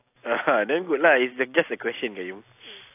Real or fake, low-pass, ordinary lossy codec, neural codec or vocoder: real; 3.6 kHz; AAC, 24 kbps; none